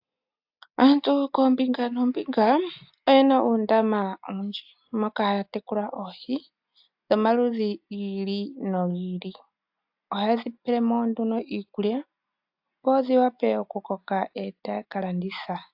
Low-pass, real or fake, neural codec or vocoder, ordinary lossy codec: 5.4 kHz; real; none; AAC, 48 kbps